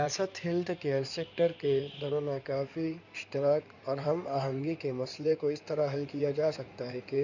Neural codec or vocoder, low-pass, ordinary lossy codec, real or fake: codec, 16 kHz in and 24 kHz out, 2.2 kbps, FireRedTTS-2 codec; 7.2 kHz; none; fake